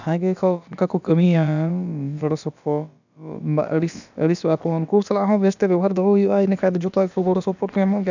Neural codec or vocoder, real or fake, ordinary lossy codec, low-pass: codec, 16 kHz, about 1 kbps, DyCAST, with the encoder's durations; fake; none; 7.2 kHz